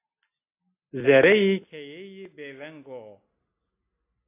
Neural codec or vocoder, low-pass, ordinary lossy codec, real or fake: none; 3.6 kHz; AAC, 24 kbps; real